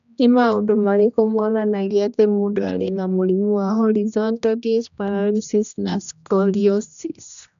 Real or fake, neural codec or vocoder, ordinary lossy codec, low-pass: fake; codec, 16 kHz, 1 kbps, X-Codec, HuBERT features, trained on general audio; none; 7.2 kHz